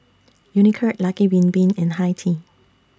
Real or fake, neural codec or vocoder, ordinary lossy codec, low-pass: real; none; none; none